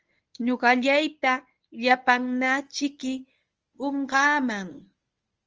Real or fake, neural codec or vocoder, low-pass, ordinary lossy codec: fake; codec, 24 kHz, 0.9 kbps, WavTokenizer, medium speech release version 1; 7.2 kHz; Opus, 24 kbps